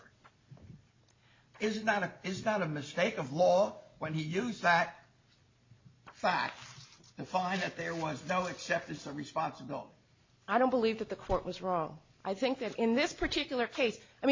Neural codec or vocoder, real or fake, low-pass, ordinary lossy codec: none; real; 7.2 kHz; MP3, 32 kbps